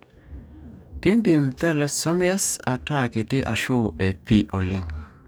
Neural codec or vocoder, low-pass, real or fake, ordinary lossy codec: codec, 44.1 kHz, 2.6 kbps, DAC; none; fake; none